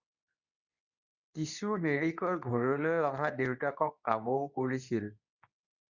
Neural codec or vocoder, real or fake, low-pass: codec, 24 kHz, 0.9 kbps, WavTokenizer, medium speech release version 2; fake; 7.2 kHz